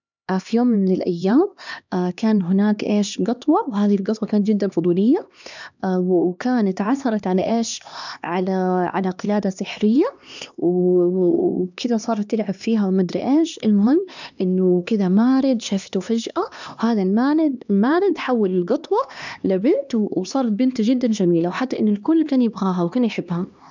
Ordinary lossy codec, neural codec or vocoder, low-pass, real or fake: none; codec, 16 kHz, 2 kbps, X-Codec, HuBERT features, trained on LibriSpeech; 7.2 kHz; fake